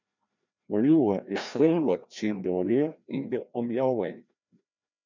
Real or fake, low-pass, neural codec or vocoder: fake; 7.2 kHz; codec, 16 kHz, 1 kbps, FreqCodec, larger model